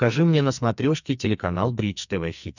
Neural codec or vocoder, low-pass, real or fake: codec, 44.1 kHz, 2.6 kbps, SNAC; 7.2 kHz; fake